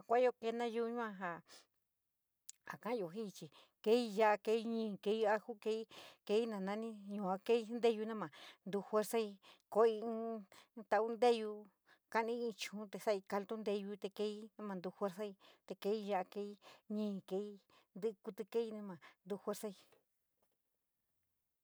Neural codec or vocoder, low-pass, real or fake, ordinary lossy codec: none; none; real; none